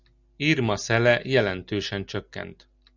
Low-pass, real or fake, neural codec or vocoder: 7.2 kHz; real; none